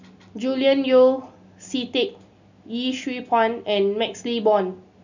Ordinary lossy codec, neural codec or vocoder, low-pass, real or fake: none; none; 7.2 kHz; real